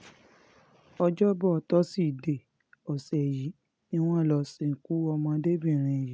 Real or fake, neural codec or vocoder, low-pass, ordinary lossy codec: real; none; none; none